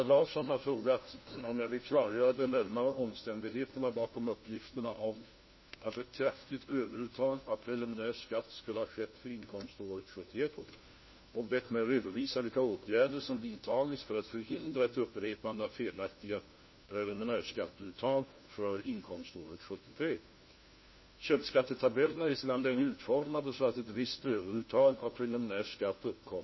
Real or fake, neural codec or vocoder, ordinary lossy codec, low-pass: fake; codec, 16 kHz, 1 kbps, FunCodec, trained on LibriTTS, 50 frames a second; MP3, 24 kbps; 7.2 kHz